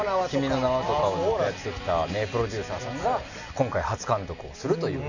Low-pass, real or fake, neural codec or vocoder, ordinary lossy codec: 7.2 kHz; real; none; none